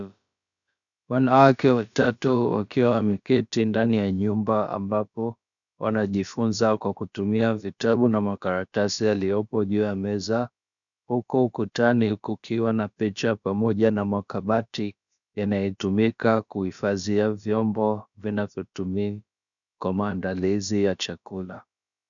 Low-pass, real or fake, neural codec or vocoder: 7.2 kHz; fake; codec, 16 kHz, about 1 kbps, DyCAST, with the encoder's durations